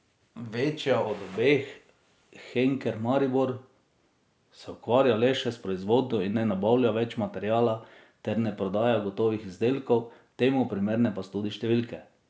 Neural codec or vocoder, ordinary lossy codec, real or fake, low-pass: none; none; real; none